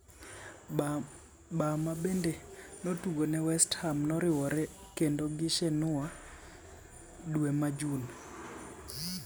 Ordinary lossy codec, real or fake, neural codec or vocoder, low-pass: none; real; none; none